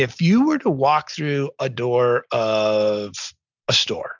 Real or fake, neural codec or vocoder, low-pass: real; none; 7.2 kHz